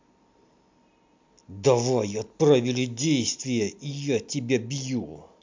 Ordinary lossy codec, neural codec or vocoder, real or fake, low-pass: MP3, 64 kbps; none; real; 7.2 kHz